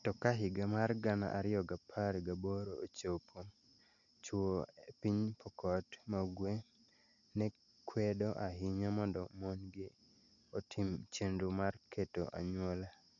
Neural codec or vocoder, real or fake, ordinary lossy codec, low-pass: none; real; none; 7.2 kHz